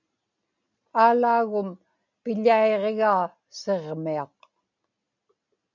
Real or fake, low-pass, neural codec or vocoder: real; 7.2 kHz; none